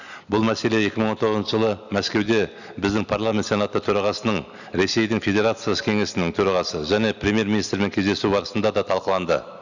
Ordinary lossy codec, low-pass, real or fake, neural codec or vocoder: none; 7.2 kHz; real; none